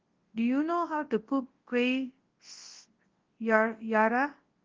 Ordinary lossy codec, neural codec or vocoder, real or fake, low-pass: Opus, 16 kbps; codec, 24 kHz, 0.9 kbps, WavTokenizer, large speech release; fake; 7.2 kHz